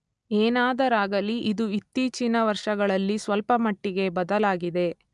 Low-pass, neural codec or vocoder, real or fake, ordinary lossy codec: 10.8 kHz; none; real; MP3, 96 kbps